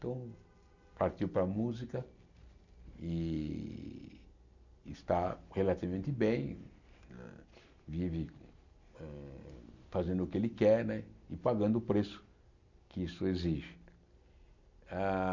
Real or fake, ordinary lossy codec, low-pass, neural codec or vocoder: real; none; 7.2 kHz; none